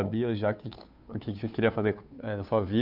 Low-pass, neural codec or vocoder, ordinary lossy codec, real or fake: 5.4 kHz; codec, 16 kHz, 2 kbps, FunCodec, trained on Chinese and English, 25 frames a second; none; fake